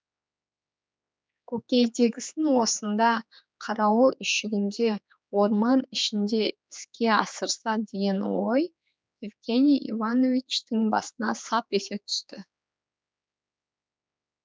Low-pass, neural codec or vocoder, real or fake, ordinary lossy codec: none; codec, 16 kHz, 4 kbps, X-Codec, HuBERT features, trained on general audio; fake; none